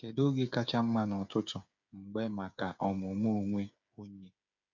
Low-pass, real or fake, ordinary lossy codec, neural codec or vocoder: 7.2 kHz; fake; none; codec, 16 kHz, 8 kbps, FreqCodec, smaller model